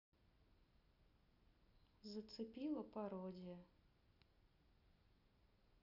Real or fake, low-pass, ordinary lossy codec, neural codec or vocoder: real; 5.4 kHz; none; none